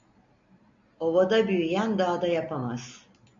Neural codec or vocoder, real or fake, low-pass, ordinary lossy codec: none; real; 7.2 kHz; MP3, 64 kbps